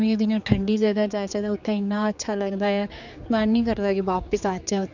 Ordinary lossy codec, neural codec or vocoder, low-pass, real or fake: none; codec, 16 kHz, 4 kbps, X-Codec, HuBERT features, trained on general audio; 7.2 kHz; fake